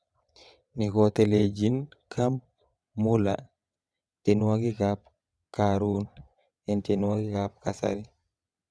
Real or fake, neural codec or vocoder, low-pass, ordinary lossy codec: fake; vocoder, 22.05 kHz, 80 mel bands, WaveNeXt; none; none